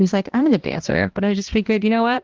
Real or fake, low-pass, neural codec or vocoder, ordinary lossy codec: fake; 7.2 kHz; codec, 16 kHz, 1 kbps, X-Codec, HuBERT features, trained on balanced general audio; Opus, 16 kbps